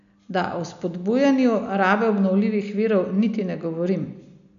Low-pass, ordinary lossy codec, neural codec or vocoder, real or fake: 7.2 kHz; none; none; real